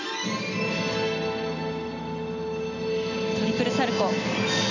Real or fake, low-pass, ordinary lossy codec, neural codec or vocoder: real; 7.2 kHz; none; none